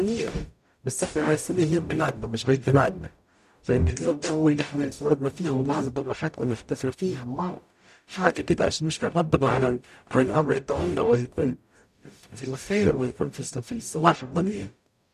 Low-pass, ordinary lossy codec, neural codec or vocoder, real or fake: 14.4 kHz; none; codec, 44.1 kHz, 0.9 kbps, DAC; fake